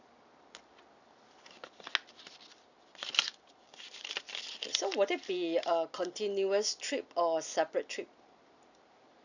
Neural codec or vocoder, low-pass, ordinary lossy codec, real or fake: none; 7.2 kHz; none; real